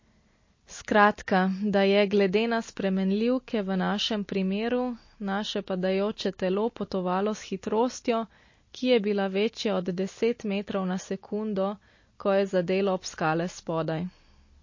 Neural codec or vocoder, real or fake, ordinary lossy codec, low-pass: none; real; MP3, 32 kbps; 7.2 kHz